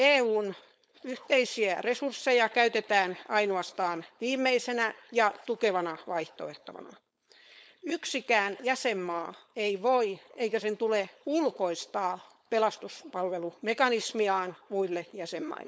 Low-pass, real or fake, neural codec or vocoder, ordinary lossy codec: none; fake; codec, 16 kHz, 4.8 kbps, FACodec; none